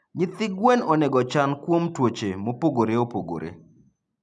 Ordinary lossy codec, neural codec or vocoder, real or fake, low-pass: none; none; real; none